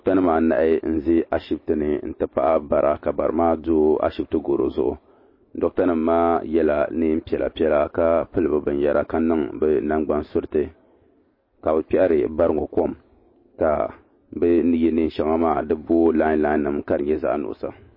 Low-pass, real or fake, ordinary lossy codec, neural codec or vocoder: 5.4 kHz; real; MP3, 24 kbps; none